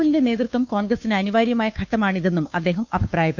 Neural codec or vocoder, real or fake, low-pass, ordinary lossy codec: codec, 16 kHz, 2 kbps, FunCodec, trained on Chinese and English, 25 frames a second; fake; 7.2 kHz; none